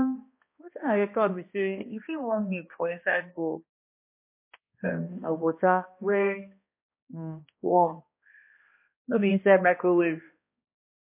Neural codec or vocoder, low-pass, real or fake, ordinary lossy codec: codec, 16 kHz, 1 kbps, X-Codec, HuBERT features, trained on balanced general audio; 3.6 kHz; fake; MP3, 24 kbps